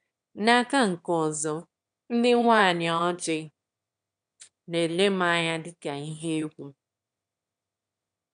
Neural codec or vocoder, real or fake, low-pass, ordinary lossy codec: autoencoder, 22.05 kHz, a latent of 192 numbers a frame, VITS, trained on one speaker; fake; 9.9 kHz; none